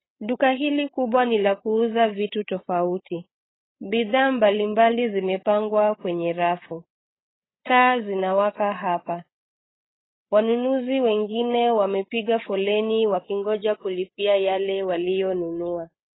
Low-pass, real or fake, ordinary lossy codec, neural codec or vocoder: 7.2 kHz; fake; AAC, 16 kbps; codec, 16 kHz, 8 kbps, FreqCodec, larger model